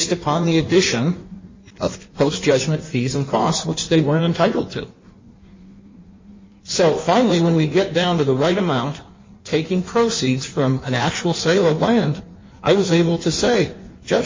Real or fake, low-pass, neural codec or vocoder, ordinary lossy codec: fake; 7.2 kHz; codec, 16 kHz in and 24 kHz out, 1.1 kbps, FireRedTTS-2 codec; MP3, 32 kbps